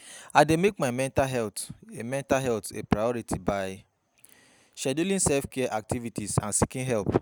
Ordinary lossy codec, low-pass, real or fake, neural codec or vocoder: none; none; real; none